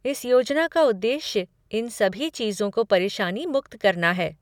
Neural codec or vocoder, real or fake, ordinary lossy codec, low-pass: none; real; none; 19.8 kHz